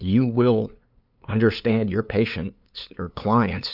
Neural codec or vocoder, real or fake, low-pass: codec, 16 kHz, 2 kbps, FunCodec, trained on LibriTTS, 25 frames a second; fake; 5.4 kHz